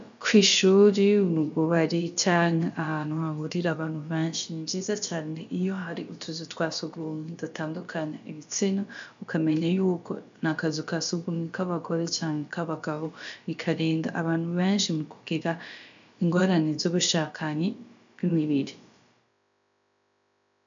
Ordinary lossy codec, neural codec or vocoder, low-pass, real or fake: MP3, 64 kbps; codec, 16 kHz, about 1 kbps, DyCAST, with the encoder's durations; 7.2 kHz; fake